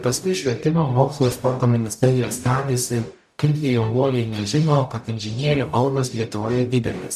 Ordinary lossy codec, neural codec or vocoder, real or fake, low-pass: MP3, 96 kbps; codec, 44.1 kHz, 0.9 kbps, DAC; fake; 14.4 kHz